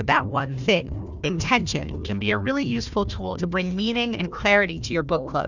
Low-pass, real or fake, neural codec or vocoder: 7.2 kHz; fake; codec, 16 kHz, 1 kbps, FunCodec, trained on Chinese and English, 50 frames a second